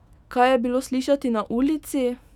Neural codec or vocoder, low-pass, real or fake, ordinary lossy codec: autoencoder, 48 kHz, 128 numbers a frame, DAC-VAE, trained on Japanese speech; 19.8 kHz; fake; none